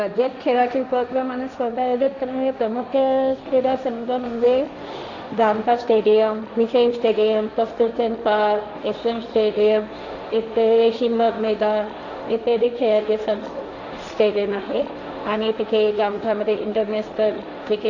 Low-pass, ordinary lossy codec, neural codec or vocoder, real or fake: 7.2 kHz; none; codec, 16 kHz, 1.1 kbps, Voila-Tokenizer; fake